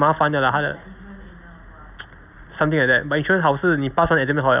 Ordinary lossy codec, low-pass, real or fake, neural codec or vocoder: none; 3.6 kHz; real; none